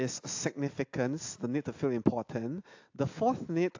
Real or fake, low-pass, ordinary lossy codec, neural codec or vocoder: real; 7.2 kHz; AAC, 48 kbps; none